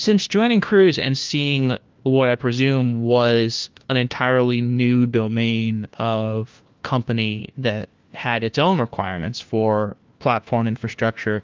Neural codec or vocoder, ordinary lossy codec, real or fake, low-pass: codec, 16 kHz, 1 kbps, FunCodec, trained on LibriTTS, 50 frames a second; Opus, 32 kbps; fake; 7.2 kHz